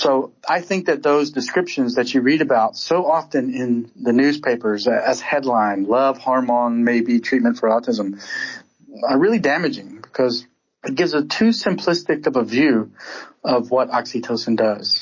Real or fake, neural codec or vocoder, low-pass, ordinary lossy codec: real; none; 7.2 kHz; MP3, 32 kbps